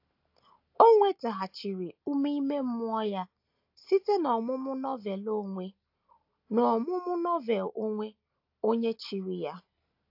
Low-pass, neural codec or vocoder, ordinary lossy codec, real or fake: 5.4 kHz; none; AAC, 48 kbps; real